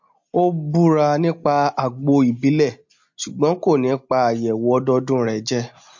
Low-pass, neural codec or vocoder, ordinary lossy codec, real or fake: 7.2 kHz; none; MP3, 48 kbps; real